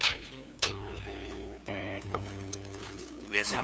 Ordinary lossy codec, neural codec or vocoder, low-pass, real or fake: none; codec, 16 kHz, 2 kbps, FunCodec, trained on LibriTTS, 25 frames a second; none; fake